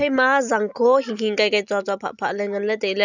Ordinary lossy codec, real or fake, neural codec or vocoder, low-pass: none; real; none; 7.2 kHz